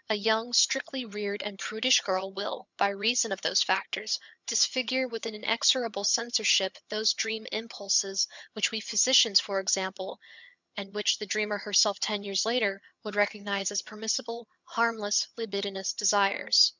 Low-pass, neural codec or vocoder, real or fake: 7.2 kHz; vocoder, 22.05 kHz, 80 mel bands, HiFi-GAN; fake